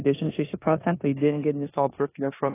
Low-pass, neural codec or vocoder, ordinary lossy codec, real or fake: 3.6 kHz; codec, 16 kHz in and 24 kHz out, 0.9 kbps, LongCat-Audio-Codec, four codebook decoder; AAC, 16 kbps; fake